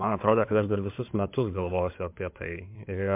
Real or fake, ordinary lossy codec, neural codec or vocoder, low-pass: fake; MP3, 32 kbps; codec, 16 kHz in and 24 kHz out, 2.2 kbps, FireRedTTS-2 codec; 3.6 kHz